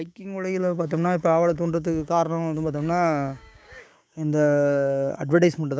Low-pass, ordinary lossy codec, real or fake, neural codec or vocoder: none; none; fake; codec, 16 kHz, 6 kbps, DAC